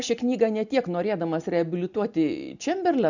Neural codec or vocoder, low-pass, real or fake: none; 7.2 kHz; real